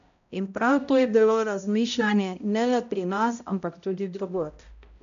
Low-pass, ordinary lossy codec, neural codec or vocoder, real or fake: 7.2 kHz; none; codec, 16 kHz, 0.5 kbps, X-Codec, HuBERT features, trained on balanced general audio; fake